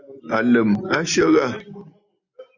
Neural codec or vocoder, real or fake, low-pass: none; real; 7.2 kHz